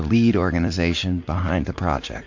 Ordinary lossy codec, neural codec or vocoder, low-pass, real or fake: MP3, 48 kbps; vocoder, 44.1 kHz, 80 mel bands, Vocos; 7.2 kHz; fake